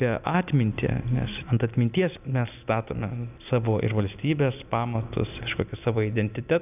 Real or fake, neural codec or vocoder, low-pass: real; none; 3.6 kHz